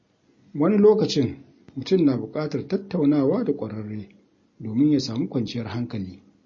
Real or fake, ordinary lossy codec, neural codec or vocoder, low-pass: real; MP3, 32 kbps; none; 7.2 kHz